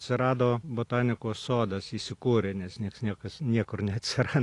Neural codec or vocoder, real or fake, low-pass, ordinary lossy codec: none; real; 10.8 kHz; AAC, 48 kbps